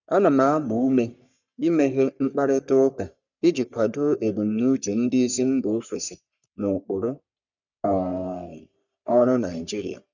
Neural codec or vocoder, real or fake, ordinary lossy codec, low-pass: codec, 44.1 kHz, 3.4 kbps, Pupu-Codec; fake; none; 7.2 kHz